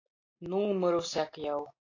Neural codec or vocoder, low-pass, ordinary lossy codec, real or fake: none; 7.2 kHz; AAC, 32 kbps; real